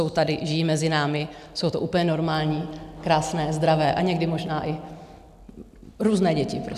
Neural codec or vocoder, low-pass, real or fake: none; 14.4 kHz; real